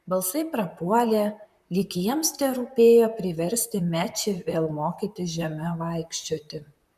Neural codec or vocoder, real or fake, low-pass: vocoder, 44.1 kHz, 128 mel bands, Pupu-Vocoder; fake; 14.4 kHz